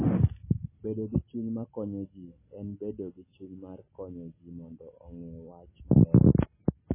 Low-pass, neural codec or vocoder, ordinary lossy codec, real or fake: 3.6 kHz; none; MP3, 16 kbps; real